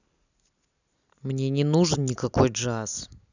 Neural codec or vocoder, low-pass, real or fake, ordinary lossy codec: none; 7.2 kHz; real; none